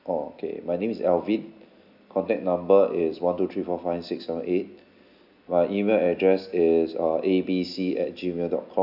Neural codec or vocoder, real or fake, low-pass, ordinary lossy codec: none; real; 5.4 kHz; none